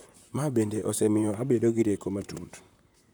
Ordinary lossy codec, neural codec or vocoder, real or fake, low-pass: none; vocoder, 44.1 kHz, 128 mel bands, Pupu-Vocoder; fake; none